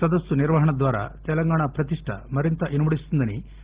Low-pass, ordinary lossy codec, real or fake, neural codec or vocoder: 3.6 kHz; Opus, 16 kbps; real; none